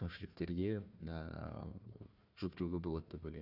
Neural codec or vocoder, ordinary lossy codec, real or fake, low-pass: codec, 16 kHz, 1 kbps, FunCodec, trained on Chinese and English, 50 frames a second; Opus, 64 kbps; fake; 5.4 kHz